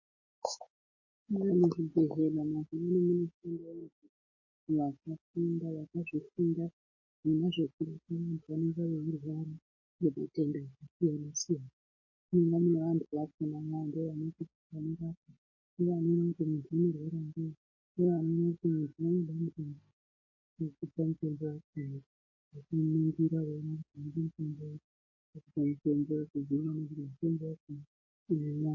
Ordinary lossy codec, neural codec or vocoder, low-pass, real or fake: MP3, 32 kbps; none; 7.2 kHz; real